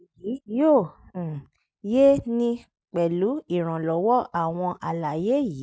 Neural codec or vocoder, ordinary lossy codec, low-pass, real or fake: none; none; none; real